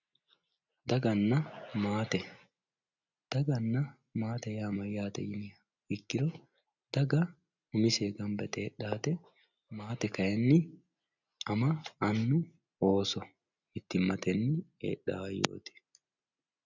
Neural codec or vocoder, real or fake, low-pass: none; real; 7.2 kHz